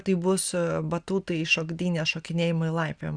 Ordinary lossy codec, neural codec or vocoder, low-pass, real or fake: Opus, 64 kbps; none; 9.9 kHz; real